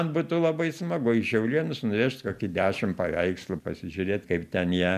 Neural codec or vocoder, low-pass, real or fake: none; 14.4 kHz; real